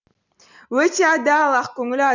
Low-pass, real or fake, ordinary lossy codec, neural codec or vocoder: 7.2 kHz; real; none; none